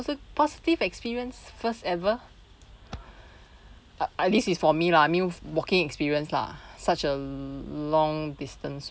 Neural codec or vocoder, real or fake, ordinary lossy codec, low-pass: none; real; none; none